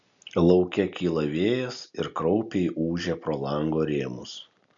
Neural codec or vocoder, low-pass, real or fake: none; 7.2 kHz; real